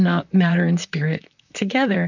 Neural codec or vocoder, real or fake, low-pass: vocoder, 44.1 kHz, 128 mel bands, Pupu-Vocoder; fake; 7.2 kHz